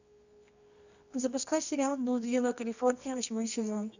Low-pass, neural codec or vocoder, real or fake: 7.2 kHz; codec, 24 kHz, 0.9 kbps, WavTokenizer, medium music audio release; fake